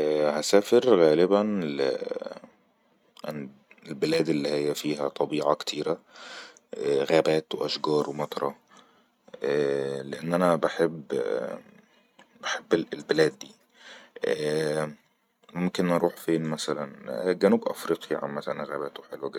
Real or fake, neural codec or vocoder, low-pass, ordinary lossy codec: real; none; 19.8 kHz; none